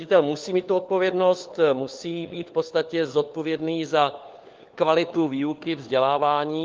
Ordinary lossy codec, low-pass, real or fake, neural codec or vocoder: Opus, 32 kbps; 7.2 kHz; fake; codec, 16 kHz, 4 kbps, FunCodec, trained on LibriTTS, 50 frames a second